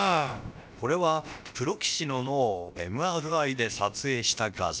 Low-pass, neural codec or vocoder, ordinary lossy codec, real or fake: none; codec, 16 kHz, about 1 kbps, DyCAST, with the encoder's durations; none; fake